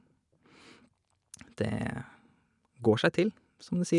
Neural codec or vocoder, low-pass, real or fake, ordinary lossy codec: none; 10.8 kHz; real; none